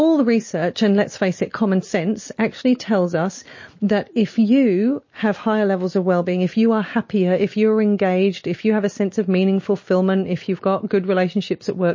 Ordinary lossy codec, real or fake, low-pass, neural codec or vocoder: MP3, 32 kbps; real; 7.2 kHz; none